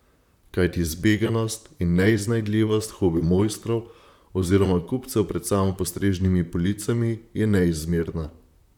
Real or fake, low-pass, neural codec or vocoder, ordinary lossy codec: fake; 19.8 kHz; vocoder, 44.1 kHz, 128 mel bands, Pupu-Vocoder; none